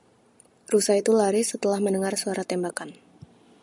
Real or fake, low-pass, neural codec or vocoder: real; 10.8 kHz; none